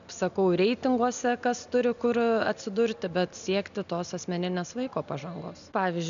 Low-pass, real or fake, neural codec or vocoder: 7.2 kHz; real; none